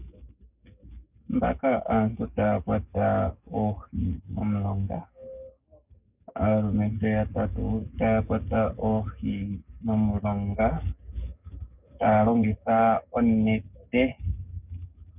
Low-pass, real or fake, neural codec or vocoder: 3.6 kHz; fake; codec, 44.1 kHz, 7.8 kbps, DAC